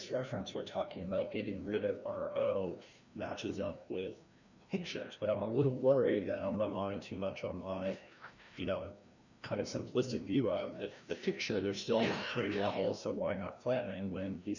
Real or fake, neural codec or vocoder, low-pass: fake; codec, 16 kHz, 1 kbps, FreqCodec, larger model; 7.2 kHz